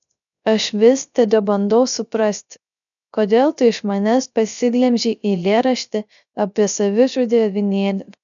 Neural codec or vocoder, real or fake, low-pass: codec, 16 kHz, 0.3 kbps, FocalCodec; fake; 7.2 kHz